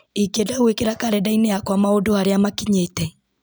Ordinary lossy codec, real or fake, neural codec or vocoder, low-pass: none; real; none; none